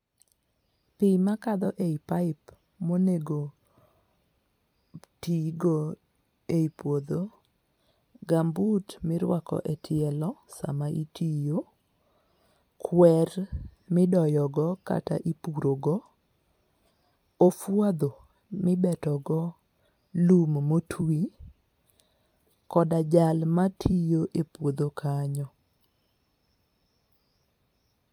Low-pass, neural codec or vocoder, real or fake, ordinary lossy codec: 19.8 kHz; vocoder, 44.1 kHz, 128 mel bands every 256 samples, BigVGAN v2; fake; MP3, 96 kbps